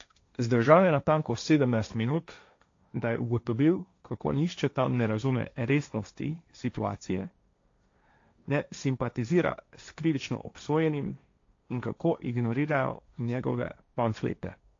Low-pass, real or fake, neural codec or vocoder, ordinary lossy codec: 7.2 kHz; fake; codec, 16 kHz, 1.1 kbps, Voila-Tokenizer; AAC, 48 kbps